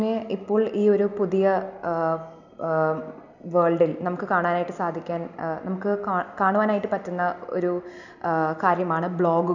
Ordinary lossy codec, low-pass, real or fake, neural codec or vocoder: none; 7.2 kHz; real; none